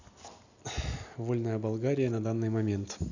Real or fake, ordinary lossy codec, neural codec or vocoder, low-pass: real; none; none; 7.2 kHz